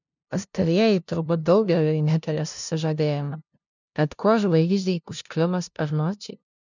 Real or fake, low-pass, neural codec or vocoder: fake; 7.2 kHz; codec, 16 kHz, 0.5 kbps, FunCodec, trained on LibriTTS, 25 frames a second